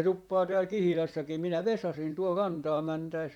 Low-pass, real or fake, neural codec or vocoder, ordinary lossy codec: 19.8 kHz; fake; vocoder, 44.1 kHz, 128 mel bands, Pupu-Vocoder; none